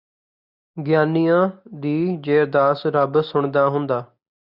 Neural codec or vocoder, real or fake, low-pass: none; real; 5.4 kHz